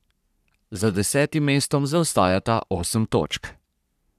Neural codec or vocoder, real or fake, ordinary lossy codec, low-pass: codec, 44.1 kHz, 3.4 kbps, Pupu-Codec; fake; none; 14.4 kHz